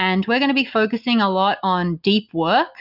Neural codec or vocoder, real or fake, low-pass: none; real; 5.4 kHz